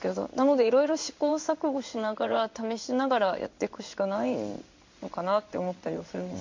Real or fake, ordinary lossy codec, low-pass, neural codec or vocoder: fake; MP3, 64 kbps; 7.2 kHz; codec, 16 kHz in and 24 kHz out, 1 kbps, XY-Tokenizer